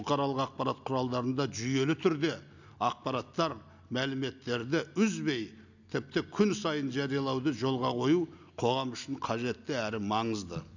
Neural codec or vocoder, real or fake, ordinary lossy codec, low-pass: none; real; none; 7.2 kHz